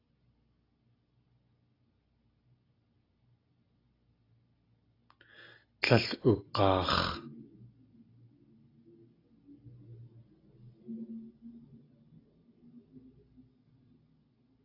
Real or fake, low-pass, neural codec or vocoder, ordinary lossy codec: real; 5.4 kHz; none; AAC, 24 kbps